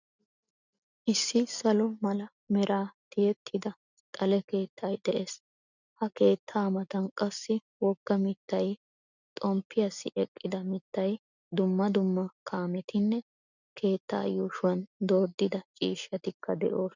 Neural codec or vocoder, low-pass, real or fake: none; 7.2 kHz; real